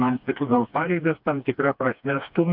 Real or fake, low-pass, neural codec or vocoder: fake; 5.4 kHz; codec, 16 kHz, 2 kbps, FreqCodec, smaller model